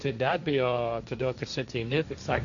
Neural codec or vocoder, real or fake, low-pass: codec, 16 kHz, 1.1 kbps, Voila-Tokenizer; fake; 7.2 kHz